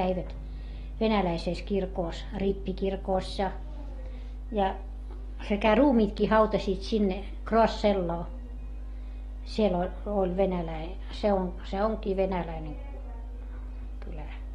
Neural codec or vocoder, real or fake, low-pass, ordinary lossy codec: none; real; 19.8 kHz; AAC, 32 kbps